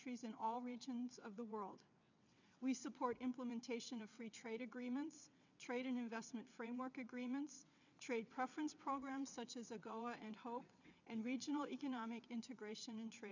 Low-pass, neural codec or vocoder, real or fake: 7.2 kHz; vocoder, 22.05 kHz, 80 mel bands, WaveNeXt; fake